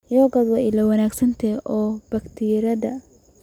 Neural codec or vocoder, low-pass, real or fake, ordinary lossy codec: none; 19.8 kHz; real; none